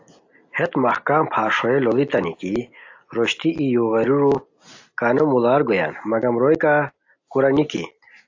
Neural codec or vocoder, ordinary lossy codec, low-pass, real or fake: none; AAC, 48 kbps; 7.2 kHz; real